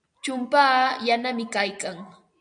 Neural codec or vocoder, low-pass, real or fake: none; 9.9 kHz; real